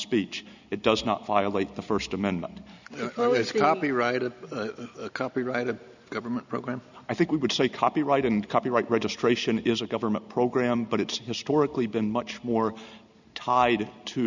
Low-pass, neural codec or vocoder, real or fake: 7.2 kHz; none; real